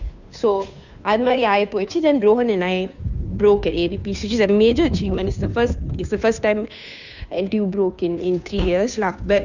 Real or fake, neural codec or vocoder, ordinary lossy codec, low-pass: fake; codec, 16 kHz, 2 kbps, FunCodec, trained on Chinese and English, 25 frames a second; none; 7.2 kHz